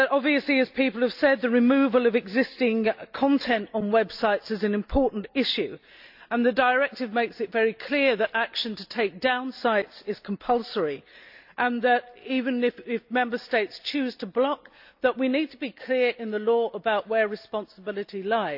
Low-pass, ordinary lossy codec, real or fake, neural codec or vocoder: 5.4 kHz; AAC, 48 kbps; real; none